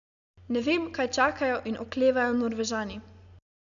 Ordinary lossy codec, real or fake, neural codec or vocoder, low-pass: none; real; none; 7.2 kHz